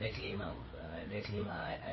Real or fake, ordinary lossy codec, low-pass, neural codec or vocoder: fake; MP3, 24 kbps; 7.2 kHz; codec, 16 kHz, 4 kbps, FreqCodec, larger model